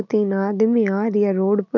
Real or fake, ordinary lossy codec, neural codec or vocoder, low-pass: real; none; none; 7.2 kHz